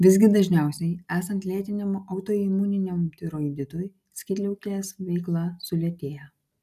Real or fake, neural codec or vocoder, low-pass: real; none; 14.4 kHz